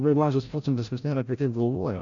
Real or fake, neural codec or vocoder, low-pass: fake; codec, 16 kHz, 0.5 kbps, FreqCodec, larger model; 7.2 kHz